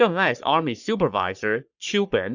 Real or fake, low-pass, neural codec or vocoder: fake; 7.2 kHz; codec, 44.1 kHz, 3.4 kbps, Pupu-Codec